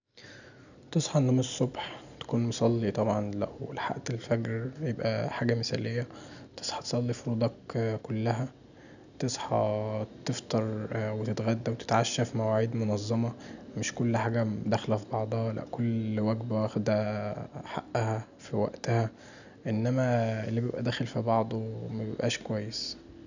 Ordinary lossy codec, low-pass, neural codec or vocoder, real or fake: none; 7.2 kHz; none; real